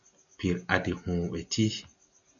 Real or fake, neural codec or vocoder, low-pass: real; none; 7.2 kHz